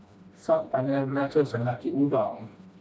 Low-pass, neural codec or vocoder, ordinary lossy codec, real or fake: none; codec, 16 kHz, 1 kbps, FreqCodec, smaller model; none; fake